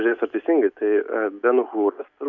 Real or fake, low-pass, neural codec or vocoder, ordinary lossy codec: real; 7.2 kHz; none; MP3, 64 kbps